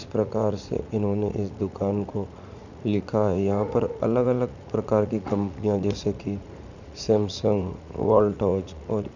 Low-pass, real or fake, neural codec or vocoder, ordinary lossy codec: 7.2 kHz; fake; vocoder, 44.1 kHz, 80 mel bands, Vocos; none